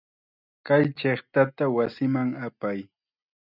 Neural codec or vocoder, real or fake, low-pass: none; real; 5.4 kHz